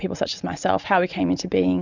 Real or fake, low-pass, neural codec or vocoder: real; 7.2 kHz; none